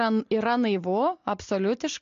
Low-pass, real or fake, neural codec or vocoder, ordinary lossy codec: 7.2 kHz; real; none; MP3, 48 kbps